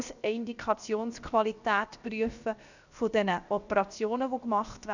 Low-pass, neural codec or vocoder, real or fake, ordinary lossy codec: 7.2 kHz; codec, 16 kHz, 0.7 kbps, FocalCodec; fake; none